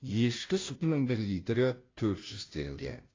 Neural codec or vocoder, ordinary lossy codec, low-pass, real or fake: codec, 16 kHz, 0.5 kbps, FunCodec, trained on Chinese and English, 25 frames a second; AAC, 32 kbps; 7.2 kHz; fake